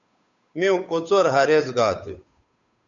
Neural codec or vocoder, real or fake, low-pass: codec, 16 kHz, 8 kbps, FunCodec, trained on Chinese and English, 25 frames a second; fake; 7.2 kHz